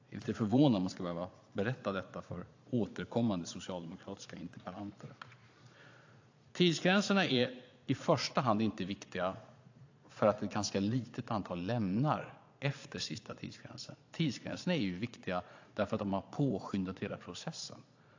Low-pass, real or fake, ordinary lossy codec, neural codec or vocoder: 7.2 kHz; fake; AAC, 48 kbps; vocoder, 22.05 kHz, 80 mel bands, Vocos